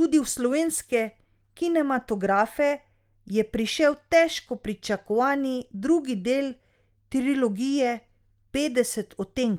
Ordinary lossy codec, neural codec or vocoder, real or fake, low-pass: Opus, 32 kbps; none; real; 19.8 kHz